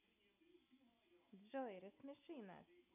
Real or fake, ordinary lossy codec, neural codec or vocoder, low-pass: real; none; none; 3.6 kHz